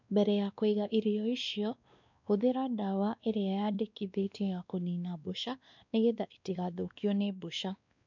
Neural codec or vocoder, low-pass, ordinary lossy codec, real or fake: codec, 16 kHz, 2 kbps, X-Codec, WavLM features, trained on Multilingual LibriSpeech; 7.2 kHz; none; fake